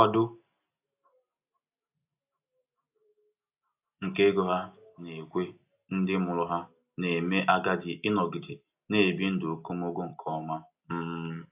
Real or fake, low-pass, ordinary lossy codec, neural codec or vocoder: real; 3.6 kHz; AAC, 32 kbps; none